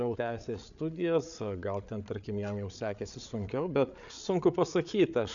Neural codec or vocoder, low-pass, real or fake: codec, 16 kHz, 8 kbps, FreqCodec, larger model; 7.2 kHz; fake